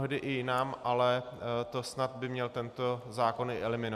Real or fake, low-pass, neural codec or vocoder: real; 14.4 kHz; none